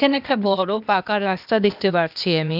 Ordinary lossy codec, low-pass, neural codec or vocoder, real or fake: none; 5.4 kHz; codec, 16 kHz, 0.8 kbps, ZipCodec; fake